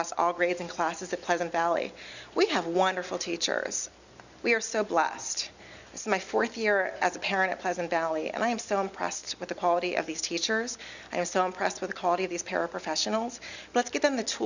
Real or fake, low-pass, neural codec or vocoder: real; 7.2 kHz; none